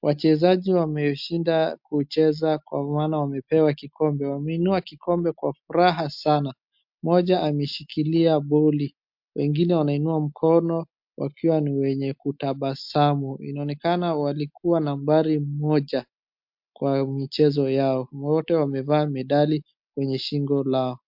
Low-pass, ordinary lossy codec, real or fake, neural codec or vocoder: 5.4 kHz; MP3, 48 kbps; real; none